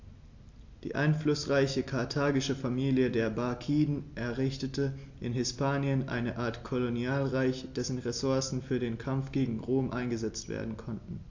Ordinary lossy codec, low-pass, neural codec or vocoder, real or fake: AAC, 48 kbps; 7.2 kHz; none; real